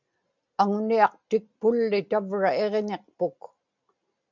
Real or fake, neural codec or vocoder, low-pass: real; none; 7.2 kHz